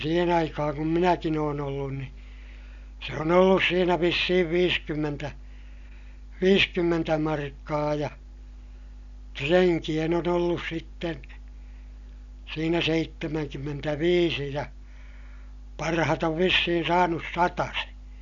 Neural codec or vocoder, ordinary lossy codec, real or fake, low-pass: none; none; real; 7.2 kHz